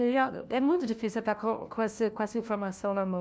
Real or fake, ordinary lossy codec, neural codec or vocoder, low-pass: fake; none; codec, 16 kHz, 1 kbps, FunCodec, trained on LibriTTS, 50 frames a second; none